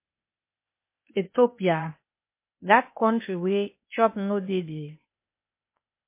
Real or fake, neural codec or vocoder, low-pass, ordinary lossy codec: fake; codec, 16 kHz, 0.8 kbps, ZipCodec; 3.6 kHz; MP3, 24 kbps